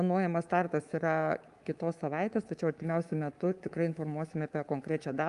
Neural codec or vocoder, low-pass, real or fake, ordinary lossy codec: codec, 24 kHz, 3.1 kbps, DualCodec; 10.8 kHz; fake; Opus, 32 kbps